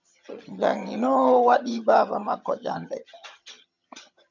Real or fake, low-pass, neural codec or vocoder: fake; 7.2 kHz; vocoder, 22.05 kHz, 80 mel bands, HiFi-GAN